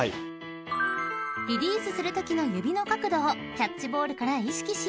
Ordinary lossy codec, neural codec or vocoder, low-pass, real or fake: none; none; none; real